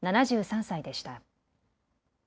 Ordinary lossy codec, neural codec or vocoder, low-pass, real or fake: none; none; none; real